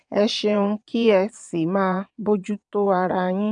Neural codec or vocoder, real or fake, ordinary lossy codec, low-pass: vocoder, 22.05 kHz, 80 mel bands, WaveNeXt; fake; none; 9.9 kHz